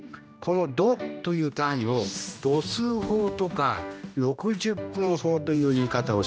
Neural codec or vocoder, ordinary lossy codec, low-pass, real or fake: codec, 16 kHz, 1 kbps, X-Codec, HuBERT features, trained on general audio; none; none; fake